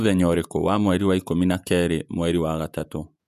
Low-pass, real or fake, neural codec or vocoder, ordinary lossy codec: 14.4 kHz; fake; vocoder, 48 kHz, 128 mel bands, Vocos; none